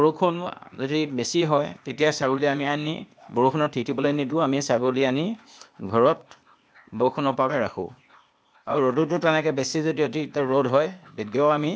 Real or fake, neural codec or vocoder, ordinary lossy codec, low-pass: fake; codec, 16 kHz, 0.8 kbps, ZipCodec; none; none